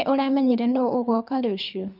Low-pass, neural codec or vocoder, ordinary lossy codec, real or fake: 5.4 kHz; codec, 24 kHz, 3 kbps, HILCodec; none; fake